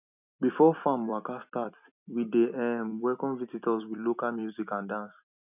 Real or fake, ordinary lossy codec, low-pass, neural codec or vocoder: fake; none; 3.6 kHz; vocoder, 44.1 kHz, 128 mel bands every 256 samples, BigVGAN v2